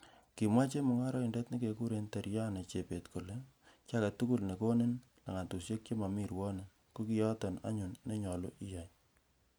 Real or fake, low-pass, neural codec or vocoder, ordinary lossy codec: real; none; none; none